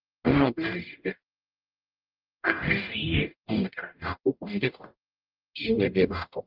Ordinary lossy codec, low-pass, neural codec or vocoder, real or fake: Opus, 32 kbps; 5.4 kHz; codec, 44.1 kHz, 0.9 kbps, DAC; fake